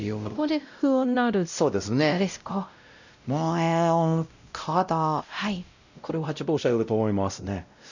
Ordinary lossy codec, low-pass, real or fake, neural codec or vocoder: none; 7.2 kHz; fake; codec, 16 kHz, 0.5 kbps, X-Codec, WavLM features, trained on Multilingual LibriSpeech